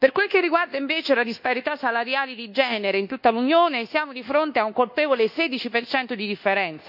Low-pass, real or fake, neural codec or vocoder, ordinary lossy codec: 5.4 kHz; fake; codec, 24 kHz, 1.2 kbps, DualCodec; none